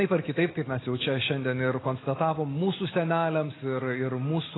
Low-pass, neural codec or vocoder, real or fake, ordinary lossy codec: 7.2 kHz; none; real; AAC, 16 kbps